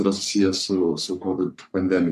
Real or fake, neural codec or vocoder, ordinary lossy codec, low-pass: fake; codec, 44.1 kHz, 3.4 kbps, Pupu-Codec; AAC, 96 kbps; 14.4 kHz